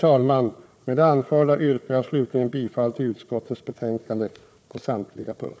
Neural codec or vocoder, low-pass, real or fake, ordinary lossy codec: codec, 16 kHz, 4 kbps, FunCodec, trained on Chinese and English, 50 frames a second; none; fake; none